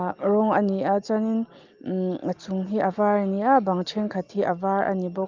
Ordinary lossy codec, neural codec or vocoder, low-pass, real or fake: Opus, 32 kbps; none; 7.2 kHz; real